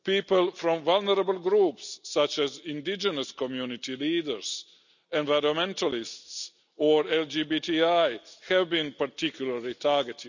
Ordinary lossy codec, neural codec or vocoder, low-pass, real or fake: none; none; 7.2 kHz; real